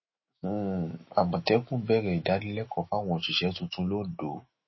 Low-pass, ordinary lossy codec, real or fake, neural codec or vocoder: 7.2 kHz; MP3, 24 kbps; fake; autoencoder, 48 kHz, 128 numbers a frame, DAC-VAE, trained on Japanese speech